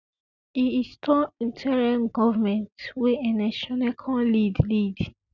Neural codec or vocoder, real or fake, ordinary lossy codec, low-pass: none; real; none; 7.2 kHz